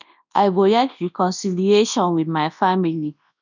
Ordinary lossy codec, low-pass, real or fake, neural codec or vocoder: none; 7.2 kHz; fake; codec, 24 kHz, 0.9 kbps, WavTokenizer, large speech release